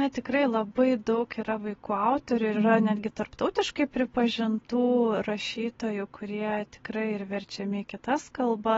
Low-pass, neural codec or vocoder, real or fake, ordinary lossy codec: 7.2 kHz; none; real; AAC, 24 kbps